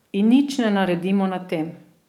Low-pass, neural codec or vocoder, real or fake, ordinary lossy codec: 19.8 kHz; codec, 44.1 kHz, 7.8 kbps, DAC; fake; none